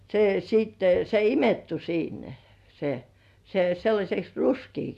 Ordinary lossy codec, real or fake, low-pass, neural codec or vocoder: none; real; 14.4 kHz; none